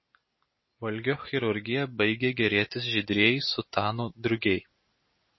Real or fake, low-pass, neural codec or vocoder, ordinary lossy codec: real; 7.2 kHz; none; MP3, 24 kbps